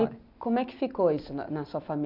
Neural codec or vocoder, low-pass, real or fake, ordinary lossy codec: none; 5.4 kHz; real; none